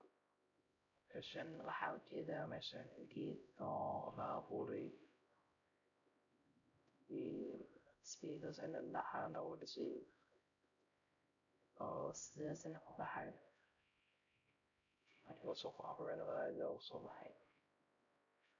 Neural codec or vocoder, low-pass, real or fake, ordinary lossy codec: codec, 16 kHz, 0.5 kbps, X-Codec, HuBERT features, trained on LibriSpeech; 7.2 kHz; fake; none